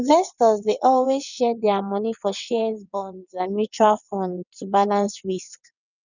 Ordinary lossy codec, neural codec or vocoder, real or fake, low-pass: none; vocoder, 22.05 kHz, 80 mel bands, WaveNeXt; fake; 7.2 kHz